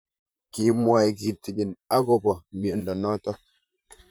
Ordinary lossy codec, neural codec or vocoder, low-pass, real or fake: none; vocoder, 44.1 kHz, 128 mel bands, Pupu-Vocoder; none; fake